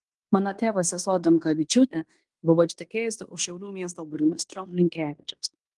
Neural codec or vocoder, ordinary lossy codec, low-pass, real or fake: codec, 16 kHz in and 24 kHz out, 0.9 kbps, LongCat-Audio-Codec, fine tuned four codebook decoder; Opus, 24 kbps; 10.8 kHz; fake